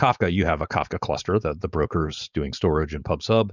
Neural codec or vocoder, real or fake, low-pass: codec, 16 kHz, 4.8 kbps, FACodec; fake; 7.2 kHz